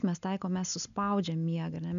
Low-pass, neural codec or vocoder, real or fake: 7.2 kHz; none; real